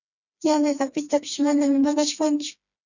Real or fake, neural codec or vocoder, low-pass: fake; codec, 16 kHz, 2 kbps, FreqCodec, smaller model; 7.2 kHz